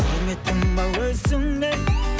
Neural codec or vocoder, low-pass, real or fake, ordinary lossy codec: none; none; real; none